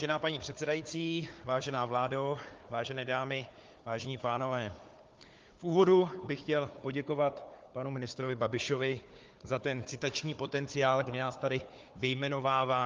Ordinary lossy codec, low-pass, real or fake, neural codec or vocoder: Opus, 32 kbps; 7.2 kHz; fake; codec, 16 kHz, 4 kbps, FunCodec, trained on Chinese and English, 50 frames a second